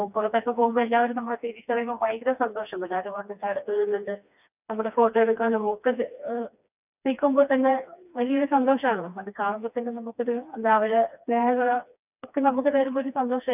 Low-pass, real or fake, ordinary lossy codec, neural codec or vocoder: 3.6 kHz; fake; none; codec, 16 kHz, 2 kbps, FreqCodec, smaller model